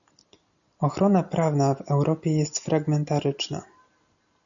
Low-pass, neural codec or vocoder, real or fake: 7.2 kHz; none; real